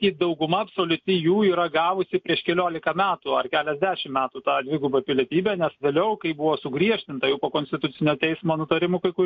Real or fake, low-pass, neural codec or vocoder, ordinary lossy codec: real; 7.2 kHz; none; MP3, 64 kbps